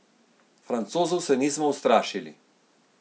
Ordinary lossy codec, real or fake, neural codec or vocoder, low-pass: none; real; none; none